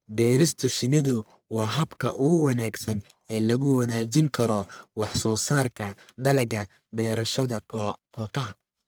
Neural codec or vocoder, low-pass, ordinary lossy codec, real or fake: codec, 44.1 kHz, 1.7 kbps, Pupu-Codec; none; none; fake